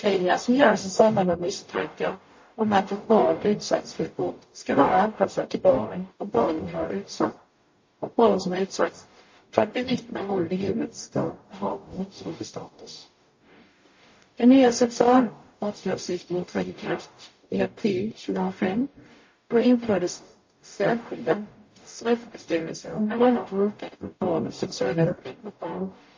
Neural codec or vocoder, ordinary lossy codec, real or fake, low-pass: codec, 44.1 kHz, 0.9 kbps, DAC; MP3, 32 kbps; fake; 7.2 kHz